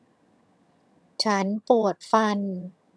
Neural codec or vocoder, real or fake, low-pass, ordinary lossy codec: vocoder, 22.05 kHz, 80 mel bands, HiFi-GAN; fake; none; none